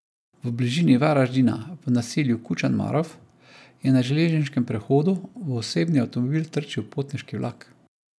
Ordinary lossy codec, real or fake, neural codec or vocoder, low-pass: none; real; none; none